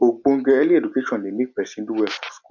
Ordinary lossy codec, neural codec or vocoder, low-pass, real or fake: MP3, 64 kbps; none; 7.2 kHz; real